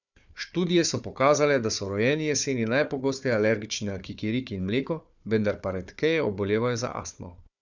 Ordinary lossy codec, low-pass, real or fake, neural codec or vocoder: none; 7.2 kHz; fake; codec, 16 kHz, 4 kbps, FunCodec, trained on Chinese and English, 50 frames a second